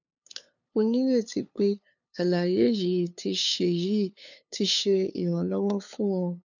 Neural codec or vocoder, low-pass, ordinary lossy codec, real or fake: codec, 16 kHz, 2 kbps, FunCodec, trained on LibriTTS, 25 frames a second; 7.2 kHz; none; fake